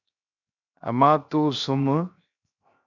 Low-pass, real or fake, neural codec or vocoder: 7.2 kHz; fake; codec, 16 kHz, 0.7 kbps, FocalCodec